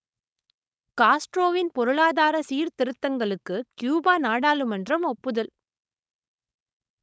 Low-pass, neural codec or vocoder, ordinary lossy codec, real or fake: none; codec, 16 kHz, 4.8 kbps, FACodec; none; fake